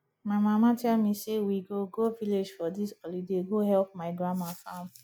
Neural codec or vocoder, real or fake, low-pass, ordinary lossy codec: none; real; none; none